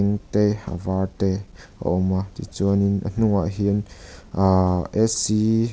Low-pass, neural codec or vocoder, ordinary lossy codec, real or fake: none; none; none; real